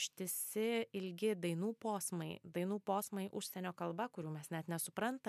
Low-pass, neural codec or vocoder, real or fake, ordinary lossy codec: 14.4 kHz; none; real; MP3, 96 kbps